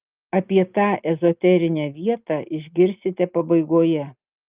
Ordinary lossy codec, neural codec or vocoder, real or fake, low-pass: Opus, 32 kbps; none; real; 3.6 kHz